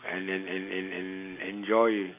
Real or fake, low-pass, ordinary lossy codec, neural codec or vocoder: real; 3.6 kHz; none; none